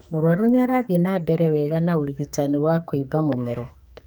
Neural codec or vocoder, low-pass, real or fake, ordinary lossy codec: codec, 44.1 kHz, 2.6 kbps, SNAC; none; fake; none